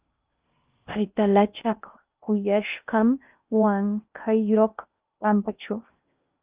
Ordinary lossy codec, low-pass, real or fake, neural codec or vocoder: Opus, 24 kbps; 3.6 kHz; fake; codec, 16 kHz in and 24 kHz out, 0.6 kbps, FocalCodec, streaming, 2048 codes